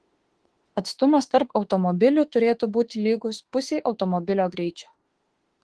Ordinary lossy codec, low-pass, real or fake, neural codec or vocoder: Opus, 16 kbps; 10.8 kHz; fake; autoencoder, 48 kHz, 32 numbers a frame, DAC-VAE, trained on Japanese speech